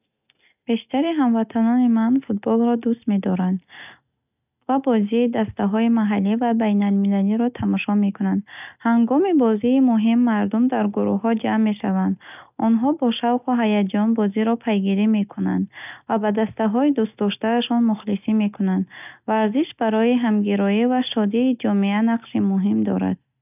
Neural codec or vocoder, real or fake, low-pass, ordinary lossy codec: none; real; 3.6 kHz; none